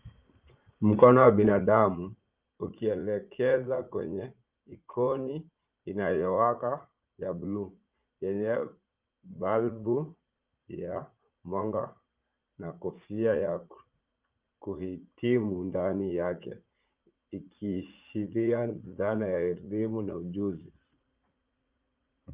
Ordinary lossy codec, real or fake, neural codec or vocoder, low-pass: Opus, 24 kbps; fake; vocoder, 44.1 kHz, 80 mel bands, Vocos; 3.6 kHz